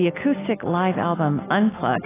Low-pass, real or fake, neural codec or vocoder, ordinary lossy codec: 3.6 kHz; real; none; AAC, 16 kbps